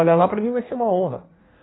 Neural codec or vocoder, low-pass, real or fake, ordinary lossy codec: codec, 16 kHz in and 24 kHz out, 1.1 kbps, FireRedTTS-2 codec; 7.2 kHz; fake; AAC, 16 kbps